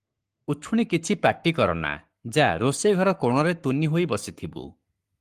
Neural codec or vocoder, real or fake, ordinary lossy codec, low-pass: codec, 44.1 kHz, 7.8 kbps, Pupu-Codec; fake; Opus, 24 kbps; 14.4 kHz